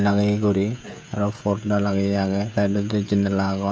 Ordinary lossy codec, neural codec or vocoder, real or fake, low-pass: none; codec, 16 kHz, 16 kbps, FreqCodec, smaller model; fake; none